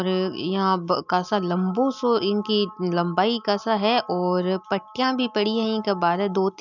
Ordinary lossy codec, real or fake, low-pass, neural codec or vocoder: none; real; 7.2 kHz; none